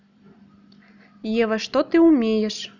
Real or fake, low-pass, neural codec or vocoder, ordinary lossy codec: real; 7.2 kHz; none; none